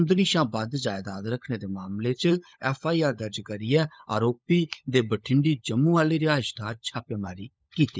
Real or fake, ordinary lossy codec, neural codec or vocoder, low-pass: fake; none; codec, 16 kHz, 16 kbps, FunCodec, trained on LibriTTS, 50 frames a second; none